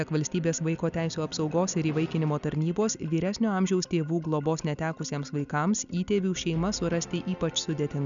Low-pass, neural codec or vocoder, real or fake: 7.2 kHz; none; real